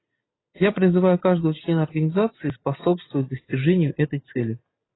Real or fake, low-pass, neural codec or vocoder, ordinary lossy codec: real; 7.2 kHz; none; AAC, 16 kbps